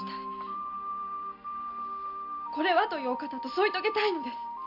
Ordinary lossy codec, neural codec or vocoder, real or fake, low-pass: none; none; real; 5.4 kHz